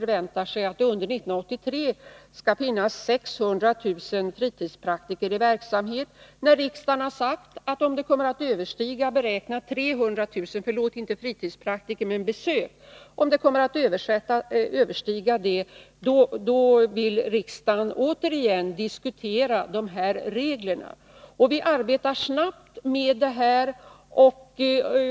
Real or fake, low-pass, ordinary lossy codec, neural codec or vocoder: real; none; none; none